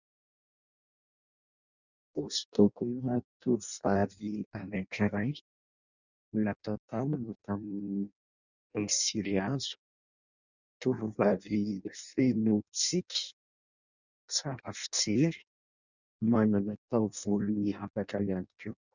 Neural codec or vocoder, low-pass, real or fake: codec, 16 kHz in and 24 kHz out, 0.6 kbps, FireRedTTS-2 codec; 7.2 kHz; fake